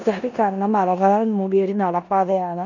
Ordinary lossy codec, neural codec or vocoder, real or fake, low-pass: none; codec, 16 kHz in and 24 kHz out, 0.9 kbps, LongCat-Audio-Codec, four codebook decoder; fake; 7.2 kHz